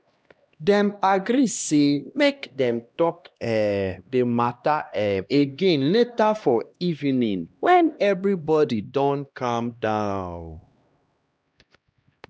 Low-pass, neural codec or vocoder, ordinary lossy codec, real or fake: none; codec, 16 kHz, 1 kbps, X-Codec, HuBERT features, trained on LibriSpeech; none; fake